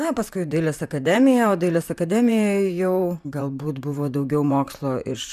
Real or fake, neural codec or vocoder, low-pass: fake; vocoder, 44.1 kHz, 128 mel bands, Pupu-Vocoder; 14.4 kHz